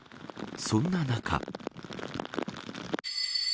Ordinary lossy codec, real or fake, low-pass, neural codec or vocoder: none; real; none; none